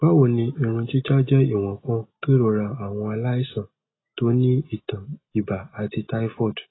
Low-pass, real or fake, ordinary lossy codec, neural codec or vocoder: 7.2 kHz; real; AAC, 16 kbps; none